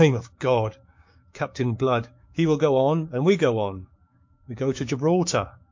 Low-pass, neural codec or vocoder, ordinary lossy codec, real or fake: 7.2 kHz; codec, 16 kHz, 6 kbps, DAC; MP3, 48 kbps; fake